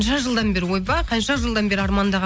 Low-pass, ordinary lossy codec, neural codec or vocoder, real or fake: none; none; none; real